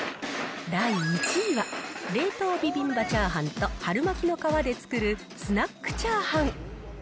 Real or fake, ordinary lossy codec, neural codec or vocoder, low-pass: real; none; none; none